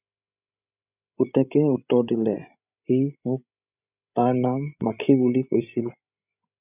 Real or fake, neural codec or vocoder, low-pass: fake; codec, 16 kHz, 16 kbps, FreqCodec, larger model; 3.6 kHz